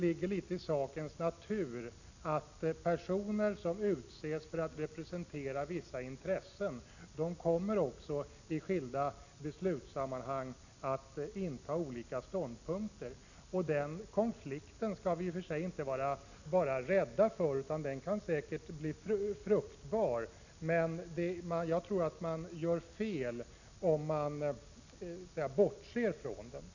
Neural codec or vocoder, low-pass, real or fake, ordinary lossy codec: none; 7.2 kHz; real; none